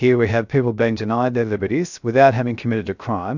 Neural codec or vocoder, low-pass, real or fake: codec, 16 kHz, 0.3 kbps, FocalCodec; 7.2 kHz; fake